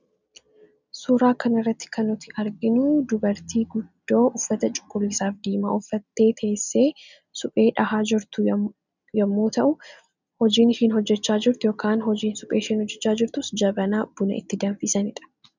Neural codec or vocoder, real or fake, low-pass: none; real; 7.2 kHz